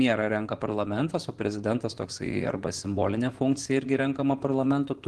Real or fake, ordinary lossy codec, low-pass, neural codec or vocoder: fake; Opus, 16 kbps; 10.8 kHz; vocoder, 24 kHz, 100 mel bands, Vocos